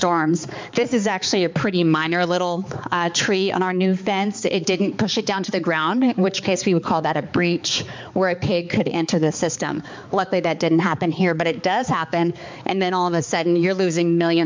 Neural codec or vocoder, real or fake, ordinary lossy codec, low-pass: codec, 16 kHz, 4 kbps, X-Codec, HuBERT features, trained on balanced general audio; fake; MP3, 64 kbps; 7.2 kHz